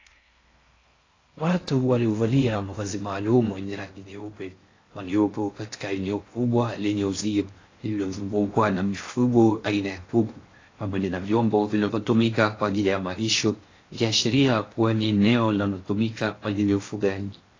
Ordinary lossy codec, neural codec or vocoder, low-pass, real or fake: AAC, 32 kbps; codec, 16 kHz in and 24 kHz out, 0.6 kbps, FocalCodec, streaming, 4096 codes; 7.2 kHz; fake